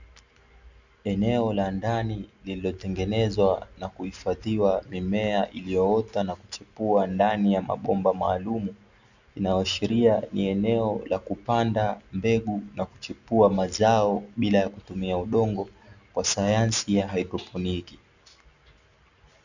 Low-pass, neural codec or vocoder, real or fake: 7.2 kHz; none; real